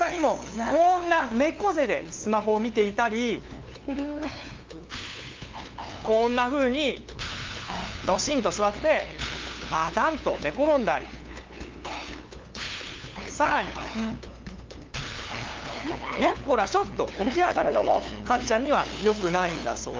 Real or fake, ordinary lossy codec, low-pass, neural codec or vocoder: fake; Opus, 24 kbps; 7.2 kHz; codec, 16 kHz, 2 kbps, FunCodec, trained on LibriTTS, 25 frames a second